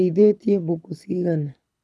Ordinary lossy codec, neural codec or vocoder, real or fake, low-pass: none; codec, 24 kHz, 6 kbps, HILCodec; fake; none